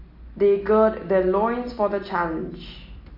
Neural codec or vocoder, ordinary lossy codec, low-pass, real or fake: none; MP3, 32 kbps; 5.4 kHz; real